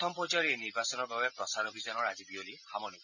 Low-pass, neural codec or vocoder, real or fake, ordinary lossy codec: 7.2 kHz; none; real; none